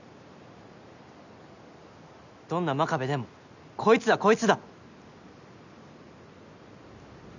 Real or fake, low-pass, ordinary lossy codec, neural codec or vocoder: real; 7.2 kHz; none; none